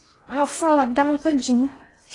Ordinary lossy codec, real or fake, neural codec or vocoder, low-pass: AAC, 32 kbps; fake; codec, 16 kHz in and 24 kHz out, 0.6 kbps, FocalCodec, streaming, 4096 codes; 10.8 kHz